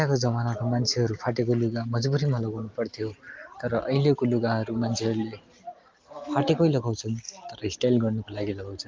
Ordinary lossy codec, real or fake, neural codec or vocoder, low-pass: Opus, 32 kbps; real; none; 7.2 kHz